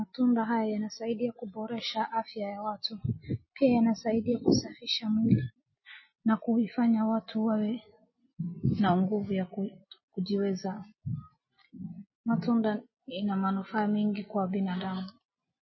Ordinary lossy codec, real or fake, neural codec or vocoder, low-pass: MP3, 24 kbps; real; none; 7.2 kHz